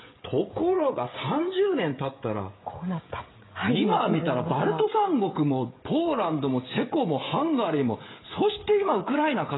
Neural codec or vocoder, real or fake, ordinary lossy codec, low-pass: codec, 16 kHz, 16 kbps, FreqCodec, smaller model; fake; AAC, 16 kbps; 7.2 kHz